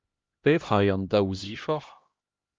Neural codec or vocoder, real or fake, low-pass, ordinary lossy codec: codec, 16 kHz, 1 kbps, X-Codec, HuBERT features, trained on LibriSpeech; fake; 7.2 kHz; Opus, 32 kbps